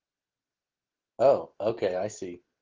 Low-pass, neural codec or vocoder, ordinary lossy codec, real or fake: 7.2 kHz; none; Opus, 16 kbps; real